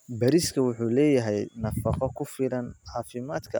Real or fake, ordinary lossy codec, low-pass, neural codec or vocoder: real; none; none; none